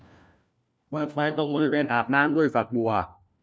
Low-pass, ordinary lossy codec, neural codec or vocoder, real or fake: none; none; codec, 16 kHz, 1 kbps, FunCodec, trained on LibriTTS, 50 frames a second; fake